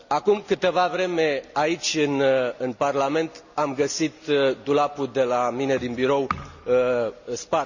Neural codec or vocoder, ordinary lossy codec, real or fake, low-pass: none; none; real; 7.2 kHz